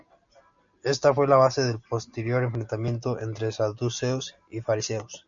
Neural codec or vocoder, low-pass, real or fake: none; 7.2 kHz; real